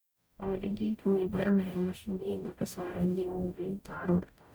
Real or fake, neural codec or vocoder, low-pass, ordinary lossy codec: fake; codec, 44.1 kHz, 0.9 kbps, DAC; none; none